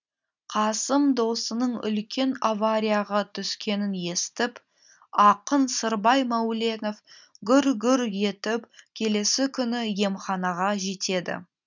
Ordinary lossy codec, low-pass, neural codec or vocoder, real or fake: none; 7.2 kHz; none; real